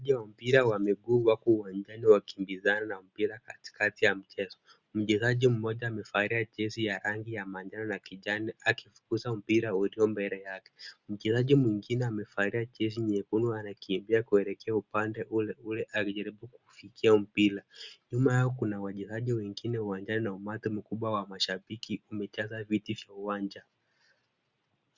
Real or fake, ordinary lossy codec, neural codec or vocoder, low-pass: real; Opus, 64 kbps; none; 7.2 kHz